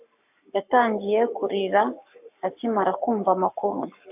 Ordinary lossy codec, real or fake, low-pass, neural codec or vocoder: AAC, 32 kbps; fake; 3.6 kHz; codec, 44.1 kHz, 7.8 kbps, Pupu-Codec